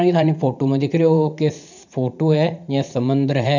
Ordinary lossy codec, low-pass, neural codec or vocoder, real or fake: none; 7.2 kHz; vocoder, 44.1 kHz, 80 mel bands, Vocos; fake